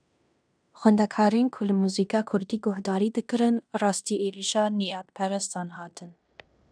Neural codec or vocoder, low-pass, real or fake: codec, 16 kHz in and 24 kHz out, 0.9 kbps, LongCat-Audio-Codec, fine tuned four codebook decoder; 9.9 kHz; fake